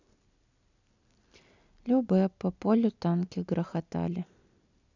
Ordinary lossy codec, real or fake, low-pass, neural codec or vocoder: none; real; 7.2 kHz; none